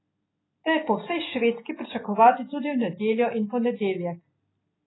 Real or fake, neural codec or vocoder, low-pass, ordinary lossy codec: real; none; 7.2 kHz; AAC, 16 kbps